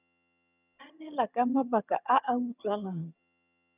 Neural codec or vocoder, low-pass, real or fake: vocoder, 22.05 kHz, 80 mel bands, HiFi-GAN; 3.6 kHz; fake